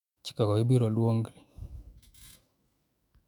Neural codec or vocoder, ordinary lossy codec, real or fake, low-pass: autoencoder, 48 kHz, 128 numbers a frame, DAC-VAE, trained on Japanese speech; none; fake; 19.8 kHz